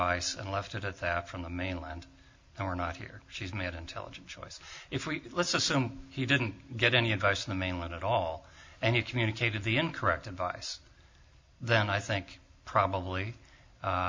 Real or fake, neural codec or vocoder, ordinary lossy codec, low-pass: real; none; MP3, 48 kbps; 7.2 kHz